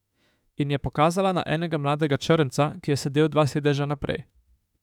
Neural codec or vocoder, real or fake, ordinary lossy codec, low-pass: autoencoder, 48 kHz, 32 numbers a frame, DAC-VAE, trained on Japanese speech; fake; none; 19.8 kHz